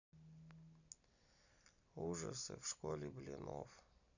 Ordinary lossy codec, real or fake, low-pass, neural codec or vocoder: none; real; 7.2 kHz; none